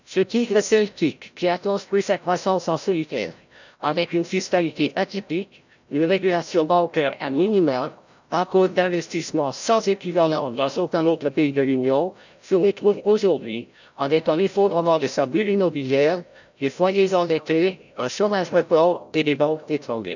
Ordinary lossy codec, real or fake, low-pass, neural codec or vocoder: none; fake; 7.2 kHz; codec, 16 kHz, 0.5 kbps, FreqCodec, larger model